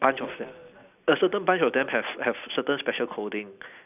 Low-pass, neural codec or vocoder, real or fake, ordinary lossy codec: 3.6 kHz; none; real; none